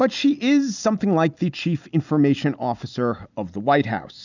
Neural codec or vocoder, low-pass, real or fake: none; 7.2 kHz; real